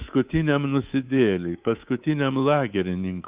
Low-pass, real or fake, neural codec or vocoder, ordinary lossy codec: 3.6 kHz; fake; codec, 24 kHz, 3.1 kbps, DualCodec; Opus, 32 kbps